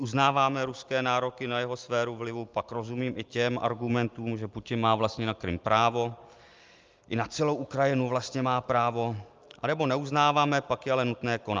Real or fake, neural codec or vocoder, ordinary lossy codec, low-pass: real; none; Opus, 24 kbps; 7.2 kHz